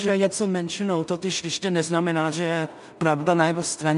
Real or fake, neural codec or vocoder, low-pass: fake; codec, 16 kHz in and 24 kHz out, 0.4 kbps, LongCat-Audio-Codec, two codebook decoder; 10.8 kHz